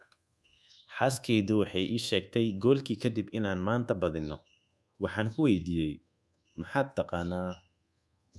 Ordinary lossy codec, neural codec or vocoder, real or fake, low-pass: none; codec, 24 kHz, 1.2 kbps, DualCodec; fake; none